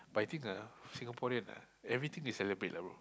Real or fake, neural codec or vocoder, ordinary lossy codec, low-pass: real; none; none; none